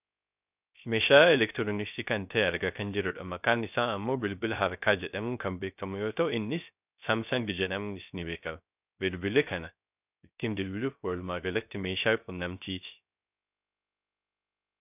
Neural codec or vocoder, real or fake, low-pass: codec, 16 kHz, 0.3 kbps, FocalCodec; fake; 3.6 kHz